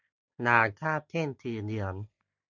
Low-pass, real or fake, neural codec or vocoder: 7.2 kHz; real; none